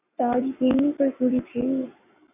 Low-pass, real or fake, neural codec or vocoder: 3.6 kHz; fake; codec, 44.1 kHz, 7.8 kbps, Pupu-Codec